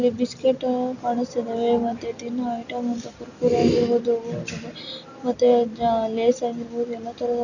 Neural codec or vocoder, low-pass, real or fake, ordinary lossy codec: none; 7.2 kHz; real; none